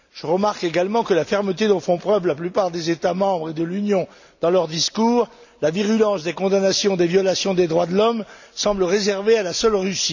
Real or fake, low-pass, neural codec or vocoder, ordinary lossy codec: real; 7.2 kHz; none; none